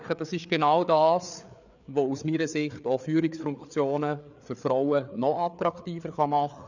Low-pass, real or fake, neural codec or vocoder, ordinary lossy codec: 7.2 kHz; fake; codec, 16 kHz, 4 kbps, FreqCodec, larger model; none